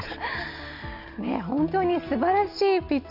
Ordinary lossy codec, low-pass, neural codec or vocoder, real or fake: none; 5.4 kHz; none; real